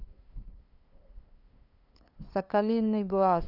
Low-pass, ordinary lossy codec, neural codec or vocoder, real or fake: 5.4 kHz; none; codec, 16 kHz, 2 kbps, FunCodec, trained on LibriTTS, 25 frames a second; fake